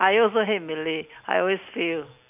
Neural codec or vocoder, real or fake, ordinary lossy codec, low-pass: none; real; none; 3.6 kHz